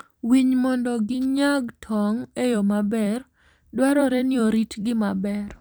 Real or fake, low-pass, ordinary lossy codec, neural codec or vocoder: fake; none; none; vocoder, 44.1 kHz, 128 mel bands every 256 samples, BigVGAN v2